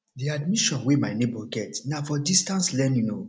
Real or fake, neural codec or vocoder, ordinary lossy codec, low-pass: real; none; none; none